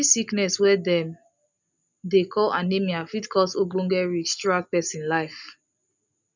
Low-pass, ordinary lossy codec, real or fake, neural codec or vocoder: 7.2 kHz; none; real; none